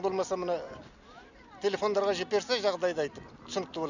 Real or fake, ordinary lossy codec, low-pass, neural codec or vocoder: real; none; 7.2 kHz; none